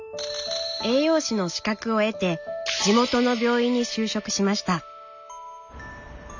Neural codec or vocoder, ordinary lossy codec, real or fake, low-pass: none; none; real; 7.2 kHz